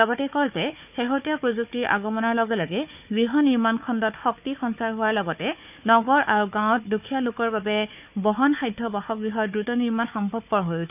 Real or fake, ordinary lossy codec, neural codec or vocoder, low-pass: fake; none; codec, 16 kHz, 4 kbps, FunCodec, trained on Chinese and English, 50 frames a second; 3.6 kHz